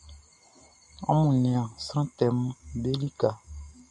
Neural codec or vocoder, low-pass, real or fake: none; 10.8 kHz; real